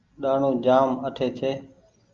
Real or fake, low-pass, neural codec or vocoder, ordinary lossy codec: real; 7.2 kHz; none; Opus, 24 kbps